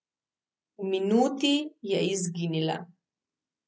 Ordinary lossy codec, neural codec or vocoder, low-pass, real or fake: none; none; none; real